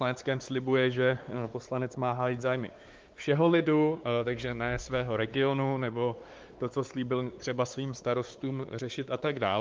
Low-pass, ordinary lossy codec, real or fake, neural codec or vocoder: 7.2 kHz; Opus, 32 kbps; fake; codec, 16 kHz, 4 kbps, X-Codec, WavLM features, trained on Multilingual LibriSpeech